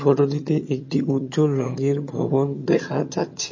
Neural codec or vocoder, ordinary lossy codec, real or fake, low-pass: vocoder, 22.05 kHz, 80 mel bands, HiFi-GAN; MP3, 32 kbps; fake; 7.2 kHz